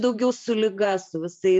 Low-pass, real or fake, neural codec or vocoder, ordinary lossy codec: 10.8 kHz; fake; vocoder, 44.1 kHz, 128 mel bands every 512 samples, BigVGAN v2; MP3, 96 kbps